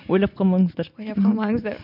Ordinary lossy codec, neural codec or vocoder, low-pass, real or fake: MP3, 48 kbps; none; 5.4 kHz; real